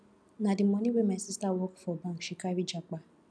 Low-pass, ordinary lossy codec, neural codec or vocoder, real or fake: none; none; none; real